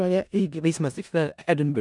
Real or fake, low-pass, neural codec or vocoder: fake; 10.8 kHz; codec, 16 kHz in and 24 kHz out, 0.4 kbps, LongCat-Audio-Codec, four codebook decoder